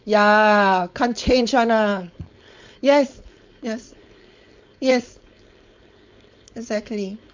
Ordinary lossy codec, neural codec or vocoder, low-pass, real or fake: MP3, 64 kbps; codec, 16 kHz, 4.8 kbps, FACodec; 7.2 kHz; fake